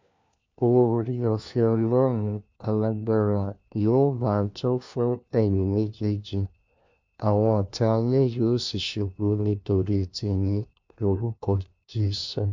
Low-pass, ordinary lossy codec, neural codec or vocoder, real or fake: 7.2 kHz; MP3, 64 kbps; codec, 16 kHz, 1 kbps, FunCodec, trained on LibriTTS, 50 frames a second; fake